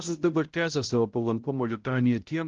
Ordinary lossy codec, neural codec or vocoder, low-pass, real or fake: Opus, 16 kbps; codec, 16 kHz, 0.5 kbps, X-Codec, HuBERT features, trained on balanced general audio; 7.2 kHz; fake